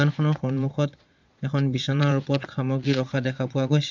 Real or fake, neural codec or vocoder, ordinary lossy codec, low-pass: fake; vocoder, 22.05 kHz, 80 mel bands, Vocos; MP3, 64 kbps; 7.2 kHz